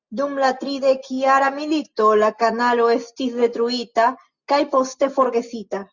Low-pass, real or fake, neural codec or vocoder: 7.2 kHz; real; none